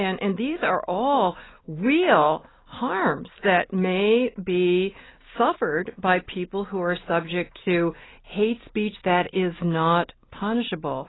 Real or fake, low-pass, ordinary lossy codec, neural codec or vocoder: real; 7.2 kHz; AAC, 16 kbps; none